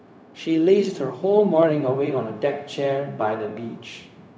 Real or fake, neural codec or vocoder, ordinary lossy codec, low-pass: fake; codec, 16 kHz, 0.4 kbps, LongCat-Audio-Codec; none; none